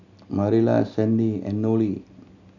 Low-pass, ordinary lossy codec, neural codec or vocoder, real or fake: 7.2 kHz; none; none; real